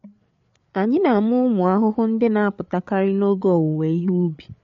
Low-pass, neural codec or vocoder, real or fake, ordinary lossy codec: 7.2 kHz; codec, 16 kHz, 8 kbps, FreqCodec, larger model; fake; MP3, 48 kbps